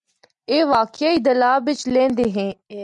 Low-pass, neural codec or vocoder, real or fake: 10.8 kHz; none; real